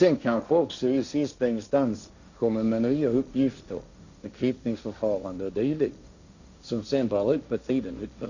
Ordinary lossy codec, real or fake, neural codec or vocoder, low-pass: none; fake; codec, 16 kHz, 1.1 kbps, Voila-Tokenizer; 7.2 kHz